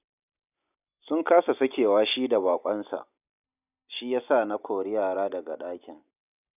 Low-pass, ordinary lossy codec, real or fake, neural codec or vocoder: 3.6 kHz; none; real; none